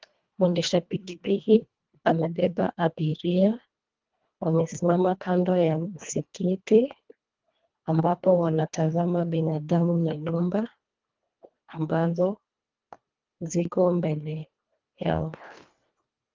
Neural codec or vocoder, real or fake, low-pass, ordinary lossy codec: codec, 24 kHz, 1.5 kbps, HILCodec; fake; 7.2 kHz; Opus, 32 kbps